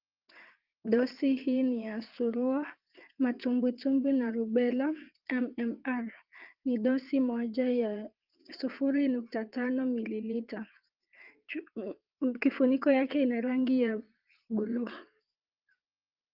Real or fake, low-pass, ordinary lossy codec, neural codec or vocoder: fake; 5.4 kHz; Opus, 32 kbps; vocoder, 22.05 kHz, 80 mel bands, WaveNeXt